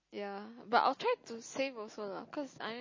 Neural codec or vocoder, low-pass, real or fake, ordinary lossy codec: none; 7.2 kHz; real; MP3, 32 kbps